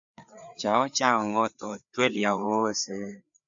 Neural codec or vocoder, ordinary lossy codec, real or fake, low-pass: codec, 16 kHz, 4 kbps, FreqCodec, larger model; AAC, 64 kbps; fake; 7.2 kHz